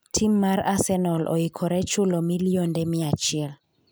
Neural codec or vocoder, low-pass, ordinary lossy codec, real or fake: none; none; none; real